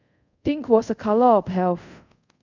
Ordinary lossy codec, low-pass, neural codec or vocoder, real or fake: none; 7.2 kHz; codec, 24 kHz, 0.5 kbps, DualCodec; fake